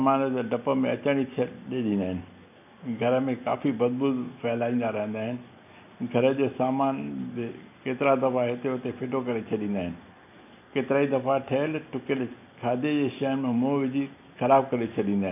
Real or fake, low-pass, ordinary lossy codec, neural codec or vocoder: real; 3.6 kHz; none; none